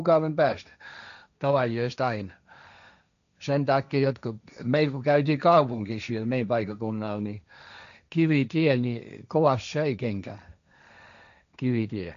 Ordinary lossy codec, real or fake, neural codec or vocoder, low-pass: none; fake; codec, 16 kHz, 1.1 kbps, Voila-Tokenizer; 7.2 kHz